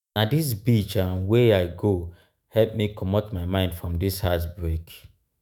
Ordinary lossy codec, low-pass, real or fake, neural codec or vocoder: none; none; fake; autoencoder, 48 kHz, 128 numbers a frame, DAC-VAE, trained on Japanese speech